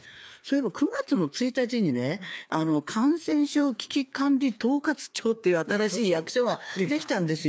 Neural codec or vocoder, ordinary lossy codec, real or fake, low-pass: codec, 16 kHz, 2 kbps, FreqCodec, larger model; none; fake; none